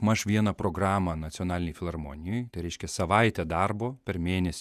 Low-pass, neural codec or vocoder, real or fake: 14.4 kHz; vocoder, 48 kHz, 128 mel bands, Vocos; fake